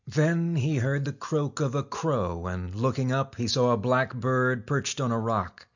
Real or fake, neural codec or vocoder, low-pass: real; none; 7.2 kHz